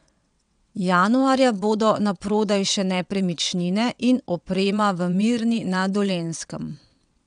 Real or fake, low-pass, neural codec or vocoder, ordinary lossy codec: fake; 9.9 kHz; vocoder, 22.05 kHz, 80 mel bands, WaveNeXt; none